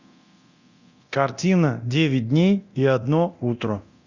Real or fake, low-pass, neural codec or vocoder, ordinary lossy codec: fake; 7.2 kHz; codec, 24 kHz, 0.9 kbps, DualCodec; Opus, 64 kbps